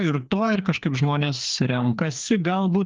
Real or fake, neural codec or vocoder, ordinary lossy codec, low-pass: fake; codec, 16 kHz, 2 kbps, FreqCodec, larger model; Opus, 32 kbps; 7.2 kHz